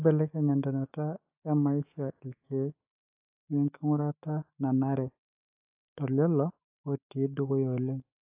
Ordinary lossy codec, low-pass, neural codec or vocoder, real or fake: AAC, 32 kbps; 3.6 kHz; autoencoder, 48 kHz, 128 numbers a frame, DAC-VAE, trained on Japanese speech; fake